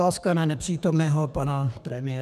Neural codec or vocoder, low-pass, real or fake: codec, 32 kHz, 1.9 kbps, SNAC; 14.4 kHz; fake